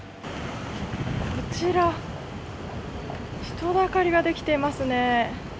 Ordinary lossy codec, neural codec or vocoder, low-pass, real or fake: none; none; none; real